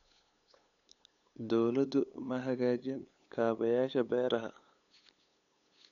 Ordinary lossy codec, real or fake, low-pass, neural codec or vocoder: none; fake; 7.2 kHz; codec, 16 kHz, 8 kbps, FunCodec, trained on LibriTTS, 25 frames a second